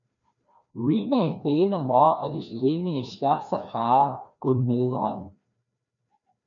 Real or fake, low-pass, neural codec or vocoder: fake; 7.2 kHz; codec, 16 kHz, 1 kbps, FreqCodec, larger model